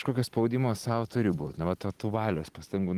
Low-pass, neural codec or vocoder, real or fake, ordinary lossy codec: 14.4 kHz; vocoder, 44.1 kHz, 128 mel bands every 256 samples, BigVGAN v2; fake; Opus, 24 kbps